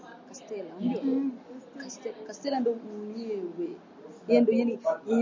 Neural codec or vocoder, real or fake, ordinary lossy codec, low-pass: none; real; MP3, 64 kbps; 7.2 kHz